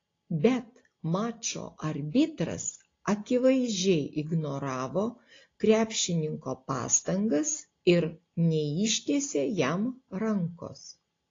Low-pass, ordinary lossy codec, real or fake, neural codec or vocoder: 7.2 kHz; AAC, 32 kbps; real; none